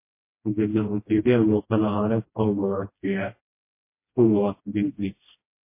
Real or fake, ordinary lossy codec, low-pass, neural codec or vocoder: fake; MP3, 24 kbps; 3.6 kHz; codec, 16 kHz, 1 kbps, FreqCodec, smaller model